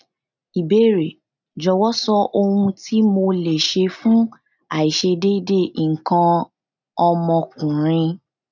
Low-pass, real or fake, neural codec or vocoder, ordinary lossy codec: 7.2 kHz; real; none; none